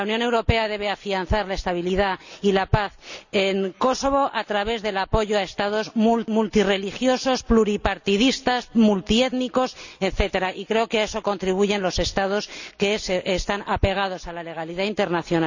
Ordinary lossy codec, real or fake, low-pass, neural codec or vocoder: none; real; 7.2 kHz; none